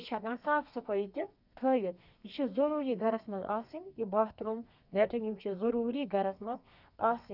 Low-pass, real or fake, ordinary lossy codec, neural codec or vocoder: 5.4 kHz; fake; none; codec, 32 kHz, 1.9 kbps, SNAC